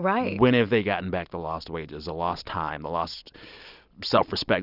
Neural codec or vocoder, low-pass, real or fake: none; 5.4 kHz; real